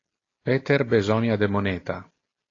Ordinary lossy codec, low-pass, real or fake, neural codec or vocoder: AAC, 32 kbps; 7.2 kHz; real; none